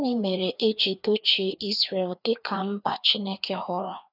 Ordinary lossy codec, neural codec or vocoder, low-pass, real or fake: none; codec, 16 kHz, 2 kbps, FreqCodec, larger model; 5.4 kHz; fake